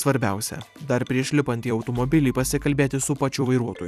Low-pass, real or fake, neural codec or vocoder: 14.4 kHz; fake; vocoder, 44.1 kHz, 128 mel bands every 256 samples, BigVGAN v2